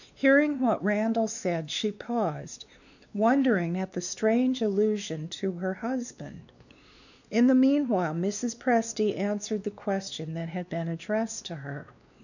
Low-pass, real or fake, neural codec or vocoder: 7.2 kHz; fake; codec, 16 kHz, 2 kbps, X-Codec, WavLM features, trained on Multilingual LibriSpeech